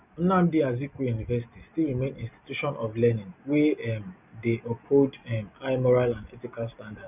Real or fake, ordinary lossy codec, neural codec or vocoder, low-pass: real; none; none; 3.6 kHz